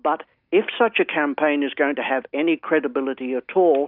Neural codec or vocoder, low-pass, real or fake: none; 5.4 kHz; real